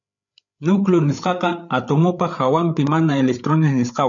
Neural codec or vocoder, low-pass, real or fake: codec, 16 kHz, 8 kbps, FreqCodec, larger model; 7.2 kHz; fake